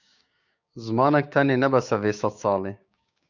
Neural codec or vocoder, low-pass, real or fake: codec, 16 kHz, 6 kbps, DAC; 7.2 kHz; fake